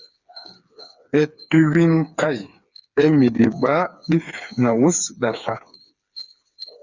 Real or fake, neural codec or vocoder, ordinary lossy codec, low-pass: fake; codec, 16 kHz, 4 kbps, FreqCodec, smaller model; Opus, 64 kbps; 7.2 kHz